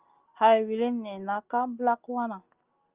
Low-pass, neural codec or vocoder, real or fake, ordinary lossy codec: 3.6 kHz; none; real; Opus, 24 kbps